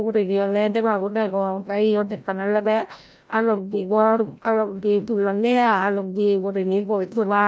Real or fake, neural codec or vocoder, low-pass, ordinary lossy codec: fake; codec, 16 kHz, 0.5 kbps, FreqCodec, larger model; none; none